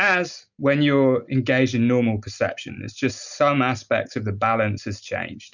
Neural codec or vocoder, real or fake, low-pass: none; real; 7.2 kHz